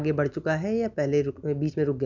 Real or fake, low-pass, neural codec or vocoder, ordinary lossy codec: real; 7.2 kHz; none; none